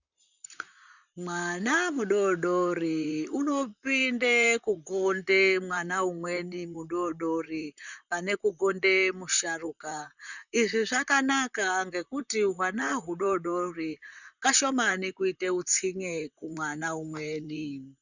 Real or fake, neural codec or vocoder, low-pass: fake; vocoder, 44.1 kHz, 128 mel bands, Pupu-Vocoder; 7.2 kHz